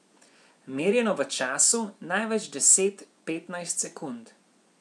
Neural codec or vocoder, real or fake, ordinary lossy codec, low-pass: vocoder, 24 kHz, 100 mel bands, Vocos; fake; none; none